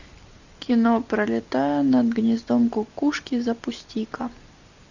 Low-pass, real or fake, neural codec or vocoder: 7.2 kHz; real; none